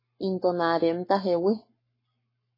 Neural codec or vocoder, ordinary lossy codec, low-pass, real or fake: codec, 44.1 kHz, 7.8 kbps, Pupu-Codec; MP3, 24 kbps; 5.4 kHz; fake